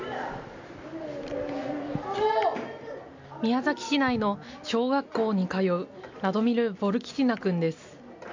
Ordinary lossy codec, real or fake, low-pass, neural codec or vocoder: MP3, 48 kbps; real; 7.2 kHz; none